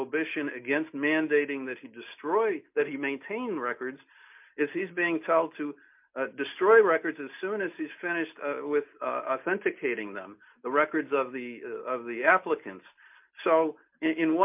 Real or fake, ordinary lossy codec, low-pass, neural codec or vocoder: real; MP3, 32 kbps; 3.6 kHz; none